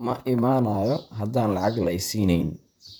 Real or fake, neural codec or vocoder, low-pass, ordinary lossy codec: fake; vocoder, 44.1 kHz, 128 mel bands, Pupu-Vocoder; none; none